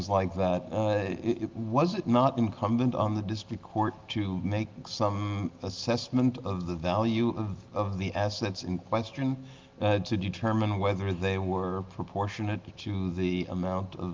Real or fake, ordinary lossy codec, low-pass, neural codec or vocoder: real; Opus, 32 kbps; 7.2 kHz; none